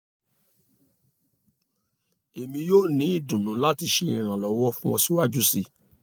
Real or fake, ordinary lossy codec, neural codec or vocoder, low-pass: real; none; none; none